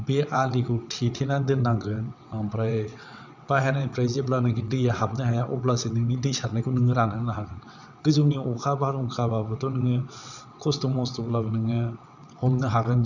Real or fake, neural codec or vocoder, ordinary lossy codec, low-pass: fake; vocoder, 22.05 kHz, 80 mel bands, WaveNeXt; none; 7.2 kHz